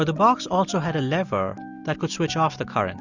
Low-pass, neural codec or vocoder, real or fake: 7.2 kHz; none; real